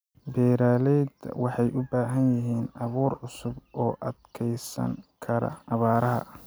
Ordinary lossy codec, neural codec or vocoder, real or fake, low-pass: none; none; real; none